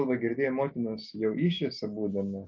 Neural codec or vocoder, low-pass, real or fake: none; 7.2 kHz; real